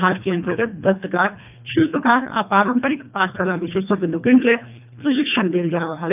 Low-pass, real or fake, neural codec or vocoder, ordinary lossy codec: 3.6 kHz; fake; codec, 24 kHz, 1.5 kbps, HILCodec; none